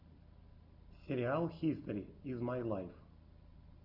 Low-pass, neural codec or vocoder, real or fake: 5.4 kHz; none; real